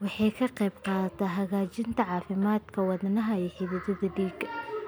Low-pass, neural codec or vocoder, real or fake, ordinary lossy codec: none; none; real; none